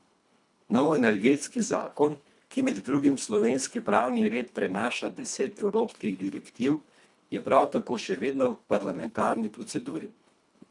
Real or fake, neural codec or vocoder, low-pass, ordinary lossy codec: fake; codec, 24 kHz, 1.5 kbps, HILCodec; 10.8 kHz; none